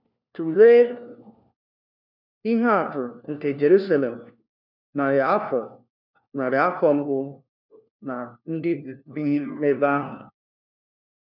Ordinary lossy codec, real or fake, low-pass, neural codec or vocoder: none; fake; 5.4 kHz; codec, 16 kHz, 1 kbps, FunCodec, trained on LibriTTS, 50 frames a second